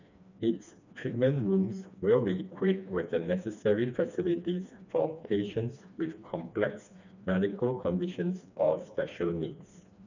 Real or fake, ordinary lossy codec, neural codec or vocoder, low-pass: fake; none; codec, 16 kHz, 2 kbps, FreqCodec, smaller model; 7.2 kHz